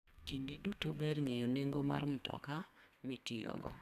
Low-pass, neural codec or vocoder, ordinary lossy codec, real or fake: 14.4 kHz; codec, 32 kHz, 1.9 kbps, SNAC; none; fake